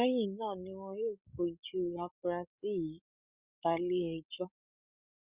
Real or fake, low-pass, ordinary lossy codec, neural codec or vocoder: fake; 3.6 kHz; Opus, 64 kbps; codec, 16 kHz, 16 kbps, FreqCodec, larger model